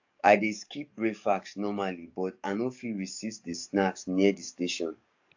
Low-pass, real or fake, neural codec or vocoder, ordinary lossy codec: 7.2 kHz; fake; codec, 44.1 kHz, 7.8 kbps, DAC; AAC, 48 kbps